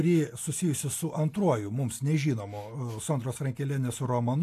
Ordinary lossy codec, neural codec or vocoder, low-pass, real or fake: AAC, 64 kbps; none; 14.4 kHz; real